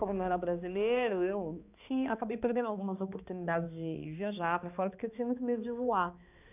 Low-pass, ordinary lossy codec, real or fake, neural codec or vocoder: 3.6 kHz; none; fake; codec, 16 kHz, 2 kbps, X-Codec, HuBERT features, trained on balanced general audio